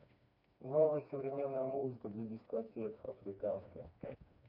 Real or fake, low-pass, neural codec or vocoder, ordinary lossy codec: fake; 5.4 kHz; codec, 16 kHz, 2 kbps, FreqCodec, smaller model; none